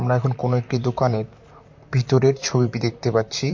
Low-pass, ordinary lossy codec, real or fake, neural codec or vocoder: 7.2 kHz; MP3, 48 kbps; fake; vocoder, 44.1 kHz, 128 mel bands, Pupu-Vocoder